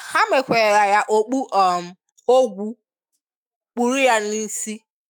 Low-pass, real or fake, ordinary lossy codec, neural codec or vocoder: 19.8 kHz; fake; none; autoencoder, 48 kHz, 128 numbers a frame, DAC-VAE, trained on Japanese speech